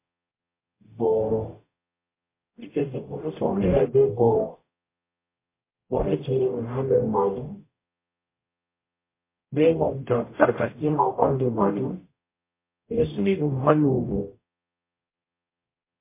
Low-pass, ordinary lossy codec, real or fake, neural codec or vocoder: 3.6 kHz; MP3, 24 kbps; fake; codec, 44.1 kHz, 0.9 kbps, DAC